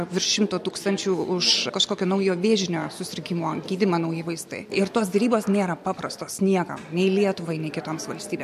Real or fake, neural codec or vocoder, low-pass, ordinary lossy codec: real; none; 14.4 kHz; MP3, 64 kbps